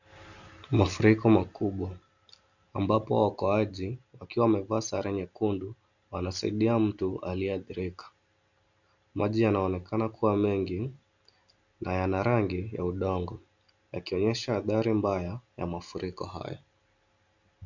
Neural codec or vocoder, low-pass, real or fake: none; 7.2 kHz; real